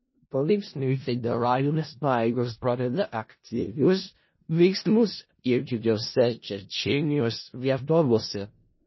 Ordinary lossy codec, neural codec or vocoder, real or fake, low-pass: MP3, 24 kbps; codec, 16 kHz in and 24 kHz out, 0.4 kbps, LongCat-Audio-Codec, four codebook decoder; fake; 7.2 kHz